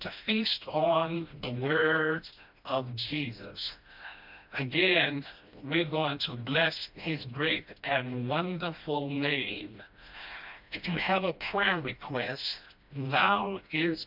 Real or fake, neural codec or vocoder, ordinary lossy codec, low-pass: fake; codec, 16 kHz, 1 kbps, FreqCodec, smaller model; MP3, 48 kbps; 5.4 kHz